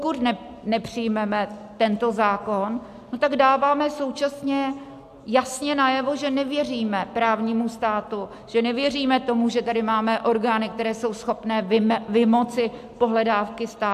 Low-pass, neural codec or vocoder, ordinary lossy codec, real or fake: 14.4 kHz; none; AAC, 96 kbps; real